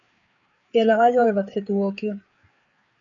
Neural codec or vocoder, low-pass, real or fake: codec, 16 kHz, 4 kbps, FreqCodec, larger model; 7.2 kHz; fake